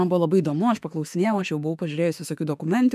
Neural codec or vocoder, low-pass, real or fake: autoencoder, 48 kHz, 32 numbers a frame, DAC-VAE, trained on Japanese speech; 14.4 kHz; fake